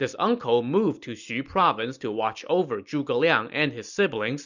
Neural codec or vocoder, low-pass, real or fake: none; 7.2 kHz; real